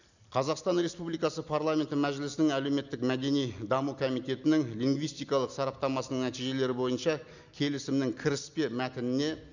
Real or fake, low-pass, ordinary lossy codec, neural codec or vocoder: real; 7.2 kHz; none; none